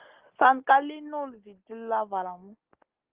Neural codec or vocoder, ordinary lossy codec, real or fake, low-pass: none; Opus, 16 kbps; real; 3.6 kHz